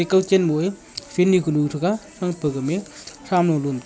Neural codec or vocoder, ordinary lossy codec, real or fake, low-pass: none; none; real; none